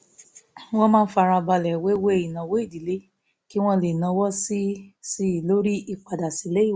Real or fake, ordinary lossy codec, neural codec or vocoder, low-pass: real; none; none; none